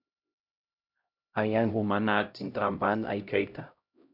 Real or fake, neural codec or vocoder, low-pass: fake; codec, 16 kHz, 0.5 kbps, X-Codec, HuBERT features, trained on LibriSpeech; 5.4 kHz